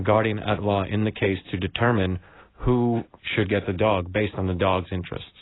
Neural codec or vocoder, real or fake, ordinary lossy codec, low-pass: none; real; AAC, 16 kbps; 7.2 kHz